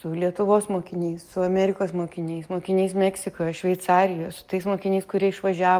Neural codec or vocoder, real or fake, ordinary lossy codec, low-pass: none; real; Opus, 32 kbps; 14.4 kHz